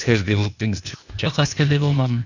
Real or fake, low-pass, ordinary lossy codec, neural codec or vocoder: fake; 7.2 kHz; none; codec, 16 kHz, 0.8 kbps, ZipCodec